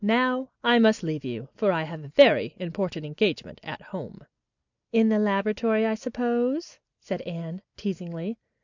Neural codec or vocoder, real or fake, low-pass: none; real; 7.2 kHz